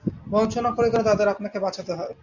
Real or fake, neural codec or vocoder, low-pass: real; none; 7.2 kHz